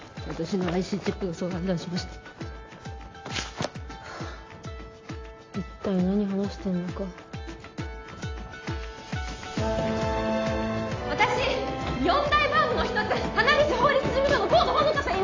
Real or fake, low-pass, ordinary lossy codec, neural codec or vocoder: real; 7.2 kHz; AAC, 32 kbps; none